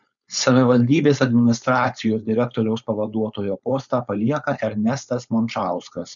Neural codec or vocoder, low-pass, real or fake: codec, 16 kHz, 4.8 kbps, FACodec; 7.2 kHz; fake